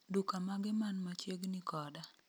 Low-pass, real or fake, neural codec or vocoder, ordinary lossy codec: none; real; none; none